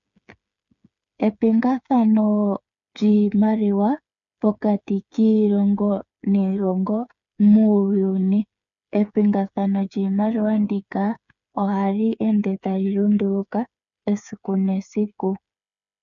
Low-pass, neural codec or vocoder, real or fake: 7.2 kHz; codec, 16 kHz, 8 kbps, FreqCodec, smaller model; fake